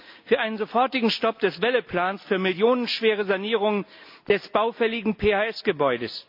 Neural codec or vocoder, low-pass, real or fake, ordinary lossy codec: none; 5.4 kHz; real; MP3, 48 kbps